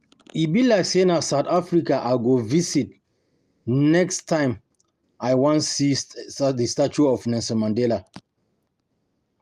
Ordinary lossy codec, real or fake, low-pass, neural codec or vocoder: Opus, 32 kbps; real; 14.4 kHz; none